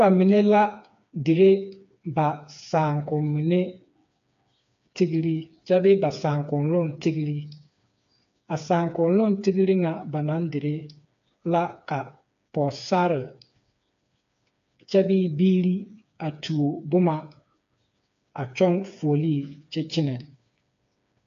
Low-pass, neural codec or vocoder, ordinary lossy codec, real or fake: 7.2 kHz; codec, 16 kHz, 4 kbps, FreqCodec, smaller model; AAC, 64 kbps; fake